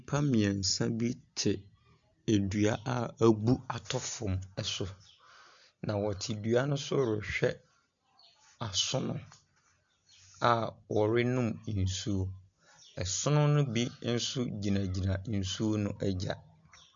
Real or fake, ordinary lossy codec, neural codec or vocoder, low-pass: real; MP3, 64 kbps; none; 7.2 kHz